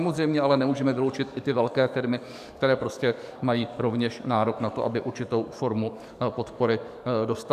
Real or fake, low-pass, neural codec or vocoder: fake; 14.4 kHz; codec, 44.1 kHz, 7.8 kbps, DAC